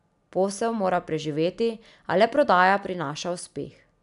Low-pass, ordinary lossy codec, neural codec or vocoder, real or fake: 10.8 kHz; none; none; real